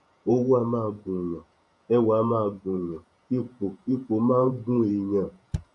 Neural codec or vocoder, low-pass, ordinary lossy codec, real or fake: vocoder, 48 kHz, 128 mel bands, Vocos; 10.8 kHz; none; fake